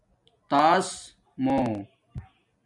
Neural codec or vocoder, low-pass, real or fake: none; 10.8 kHz; real